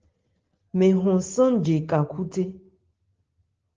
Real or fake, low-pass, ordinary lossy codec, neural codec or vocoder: real; 7.2 kHz; Opus, 16 kbps; none